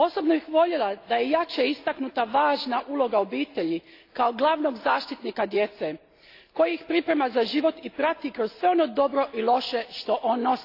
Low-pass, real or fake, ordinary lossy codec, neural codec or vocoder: 5.4 kHz; real; AAC, 32 kbps; none